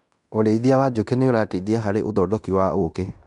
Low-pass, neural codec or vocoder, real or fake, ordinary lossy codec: 10.8 kHz; codec, 16 kHz in and 24 kHz out, 0.9 kbps, LongCat-Audio-Codec, fine tuned four codebook decoder; fake; none